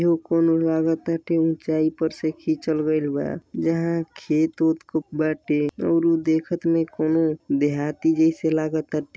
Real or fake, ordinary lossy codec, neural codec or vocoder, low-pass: real; none; none; none